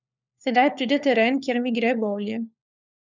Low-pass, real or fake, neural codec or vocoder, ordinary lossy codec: 7.2 kHz; fake; codec, 16 kHz, 4 kbps, FunCodec, trained on LibriTTS, 50 frames a second; none